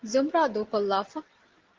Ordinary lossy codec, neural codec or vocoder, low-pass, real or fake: Opus, 16 kbps; none; 7.2 kHz; real